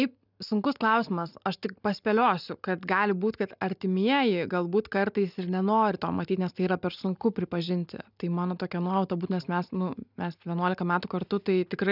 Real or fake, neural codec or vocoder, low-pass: real; none; 5.4 kHz